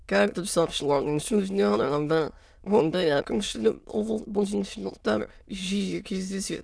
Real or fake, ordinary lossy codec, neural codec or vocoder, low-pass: fake; none; autoencoder, 22.05 kHz, a latent of 192 numbers a frame, VITS, trained on many speakers; none